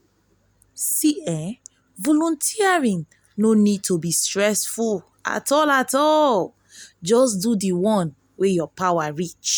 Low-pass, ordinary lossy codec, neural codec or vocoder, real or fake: none; none; none; real